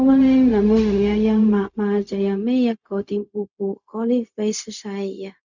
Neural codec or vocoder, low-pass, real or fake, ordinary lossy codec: codec, 16 kHz, 0.4 kbps, LongCat-Audio-Codec; 7.2 kHz; fake; none